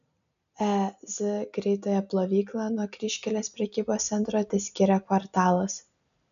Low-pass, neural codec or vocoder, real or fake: 7.2 kHz; none; real